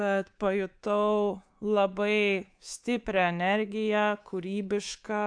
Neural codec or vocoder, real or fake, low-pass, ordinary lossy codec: codec, 24 kHz, 3.1 kbps, DualCodec; fake; 9.9 kHz; AAC, 64 kbps